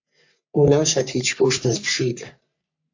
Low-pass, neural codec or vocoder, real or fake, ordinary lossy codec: 7.2 kHz; codec, 44.1 kHz, 3.4 kbps, Pupu-Codec; fake; AAC, 48 kbps